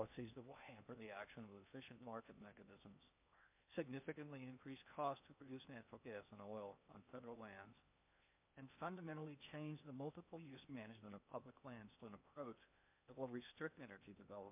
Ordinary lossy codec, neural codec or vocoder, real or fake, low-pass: MP3, 32 kbps; codec, 16 kHz in and 24 kHz out, 0.8 kbps, FocalCodec, streaming, 65536 codes; fake; 3.6 kHz